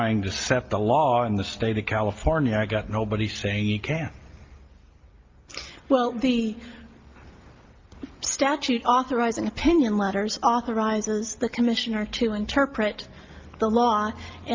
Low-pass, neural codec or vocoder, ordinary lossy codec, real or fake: 7.2 kHz; none; Opus, 32 kbps; real